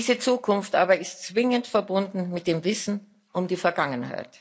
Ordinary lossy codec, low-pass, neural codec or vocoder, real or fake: none; none; none; real